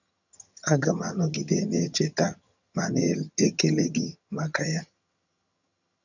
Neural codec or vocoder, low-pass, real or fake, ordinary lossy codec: vocoder, 22.05 kHz, 80 mel bands, HiFi-GAN; 7.2 kHz; fake; none